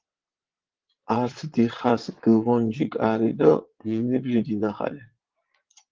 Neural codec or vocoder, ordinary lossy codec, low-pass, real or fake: vocoder, 22.05 kHz, 80 mel bands, Vocos; Opus, 16 kbps; 7.2 kHz; fake